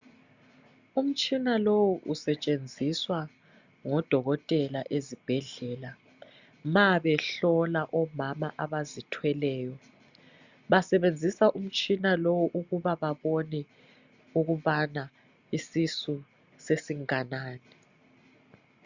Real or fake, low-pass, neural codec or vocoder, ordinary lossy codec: real; 7.2 kHz; none; Opus, 64 kbps